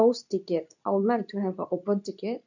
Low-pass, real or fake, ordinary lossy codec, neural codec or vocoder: 7.2 kHz; fake; none; codec, 24 kHz, 0.9 kbps, WavTokenizer, medium speech release version 2